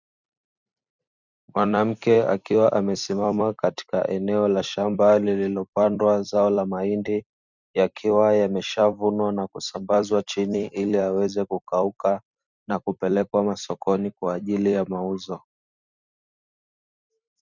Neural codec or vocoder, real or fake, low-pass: vocoder, 44.1 kHz, 128 mel bands every 256 samples, BigVGAN v2; fake; 7.2 kHz